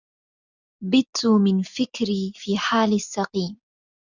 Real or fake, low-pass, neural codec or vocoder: real; 7.2 kHz; none